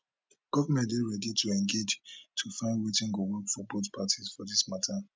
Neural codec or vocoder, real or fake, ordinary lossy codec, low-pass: none; real; none; none